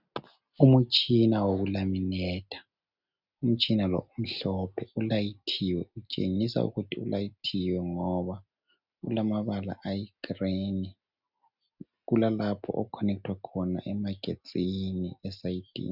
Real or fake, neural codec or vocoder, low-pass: real; none; 5.4 kHz